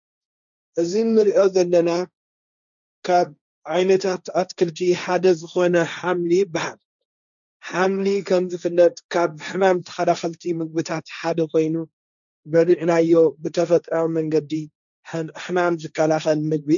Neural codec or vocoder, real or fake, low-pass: codec, 16 kHz, 1.1 kbps, Voila-Tokenizer; fake; 7.2 kHz